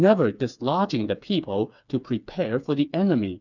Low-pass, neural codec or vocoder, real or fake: 7.2 kHz; codec, 16 kHz, 4 kbps, FreqCodec, smaller model; fake